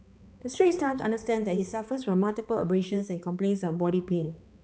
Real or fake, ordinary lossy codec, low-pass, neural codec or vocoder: fake; none; none; codec, 16 kHz, 2 kbps, X-Codec, HuBERT features, trained on balanced general audio